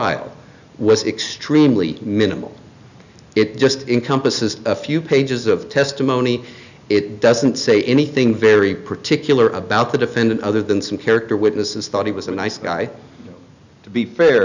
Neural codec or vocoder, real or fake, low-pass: none; real; 7.2 kHz